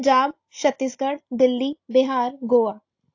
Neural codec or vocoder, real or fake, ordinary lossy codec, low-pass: none; real; AAC, 48 kbps; 7.2 kHz